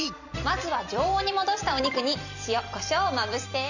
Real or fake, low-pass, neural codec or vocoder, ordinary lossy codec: fake; 7.2 kHz; vocoder, 44.1 kHz, 128 mel bands every 256 samples, BigVGAN v2; none